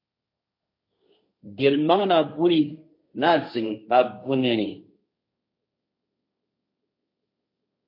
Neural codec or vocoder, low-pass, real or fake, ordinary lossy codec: codec, 16 kHz, 1.1 kbps, Voila-Tokenizer; 5.4 kHz; fake; MP3, 32 kbps